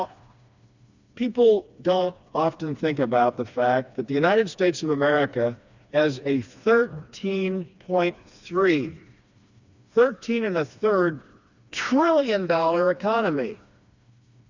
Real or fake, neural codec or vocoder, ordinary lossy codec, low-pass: fake; codec, 16 kHz, 2 kbps, FreqCodec, smaller model; Opus, 64 kbps; 7.2 kHz